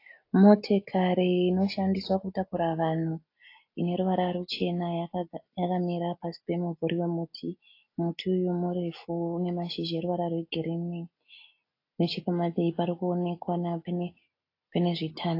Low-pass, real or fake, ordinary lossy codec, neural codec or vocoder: 5.4 kHz; real; AAC, 32 kbps; none